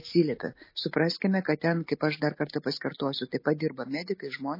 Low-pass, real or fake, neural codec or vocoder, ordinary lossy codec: 5.4 kHz; real; none; MP3, 24 kbps